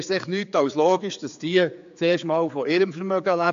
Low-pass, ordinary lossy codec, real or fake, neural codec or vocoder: 7.2 kHz; AAC, 64 kbps; fake; codec, 16 kHz, 4 kbps, X-Codec, HuBERT features, trained on general audio